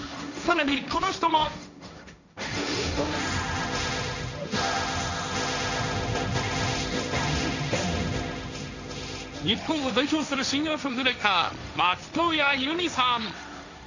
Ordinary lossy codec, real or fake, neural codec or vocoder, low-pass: none; fake; codec, 16 kHz, 1.1 kbps, Voila-Tokenizer; 7.2 kHz